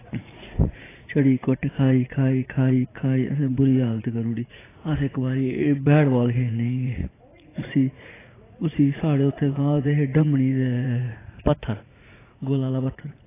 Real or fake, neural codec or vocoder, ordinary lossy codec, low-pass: fake; vocoder, 44.1 kHz, 80 mel bands, Vocos; AAC, 16 kbps; 3.6 kHz